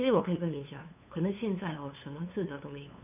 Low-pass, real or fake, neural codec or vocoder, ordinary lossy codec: 3.6 kHz; fake; codec, 16 kHz, 2 kbps, FunCodec, trained on LibriTTS, 25 frames a second; none